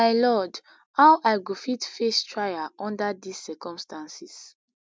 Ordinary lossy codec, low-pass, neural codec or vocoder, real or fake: none; none; none; real